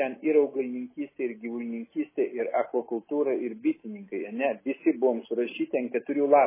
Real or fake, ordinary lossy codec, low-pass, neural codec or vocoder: real; MP3, 16 kbps; 3.6 kHz; none